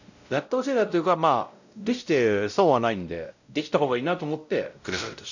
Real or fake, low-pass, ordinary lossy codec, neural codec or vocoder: fake; 7.2 kHz; none; codec, 16 kHz, 0.5 kbps, X-Codec, WavLM features, trained on Multilingual LibriSpeech